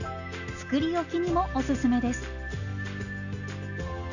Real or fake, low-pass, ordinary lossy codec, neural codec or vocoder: real; 7.2 kHz; none; none